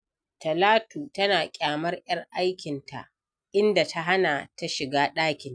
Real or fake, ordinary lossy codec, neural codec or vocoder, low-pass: real; none; none; 9.9 kHz